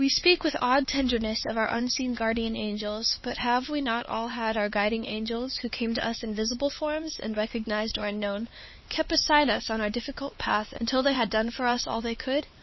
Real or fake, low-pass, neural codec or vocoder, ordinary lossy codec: fake; 7.2 kHz; codec, 16 kHz, 4 kbps, X-Codec, HuBERT features, trained on LibriSpeech; MP3, 24 kbps